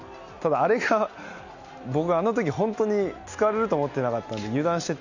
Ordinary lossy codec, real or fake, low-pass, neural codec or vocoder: none; real; 7.2 kHz; none